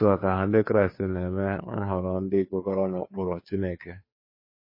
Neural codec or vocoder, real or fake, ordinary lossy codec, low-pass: codec, 16 kHz, 2 kbps, FunCodec, trained on Chinese and English, 25 frames a second; fake; MP3, 24 kbps; 5.4 kHz